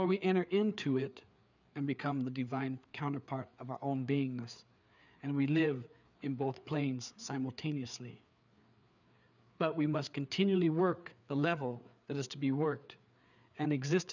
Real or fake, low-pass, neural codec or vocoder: fake; 7.2 kHz; codec, 16 kHz, 4 kbps, FreqCodec, larger model